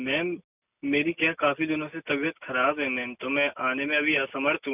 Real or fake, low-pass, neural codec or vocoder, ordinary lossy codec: real; 3.6 kHz; none; none